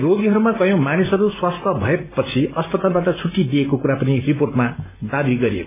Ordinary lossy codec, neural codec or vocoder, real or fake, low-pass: MP3, 24 kbps; none; real; 3.6 kHz